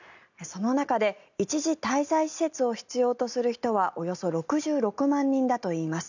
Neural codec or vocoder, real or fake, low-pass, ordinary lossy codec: none; real; 7.2 kHz; none